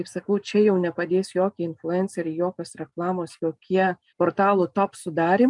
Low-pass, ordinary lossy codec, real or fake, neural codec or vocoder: 10.8 kHz; MP3, 96 kbps; real; none